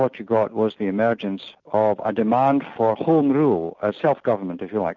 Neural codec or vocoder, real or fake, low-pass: none; real; 7.2 kHz